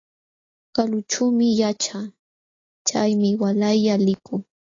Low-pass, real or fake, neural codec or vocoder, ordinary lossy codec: 7.2 kHz; real; none; AAC, 32 kbps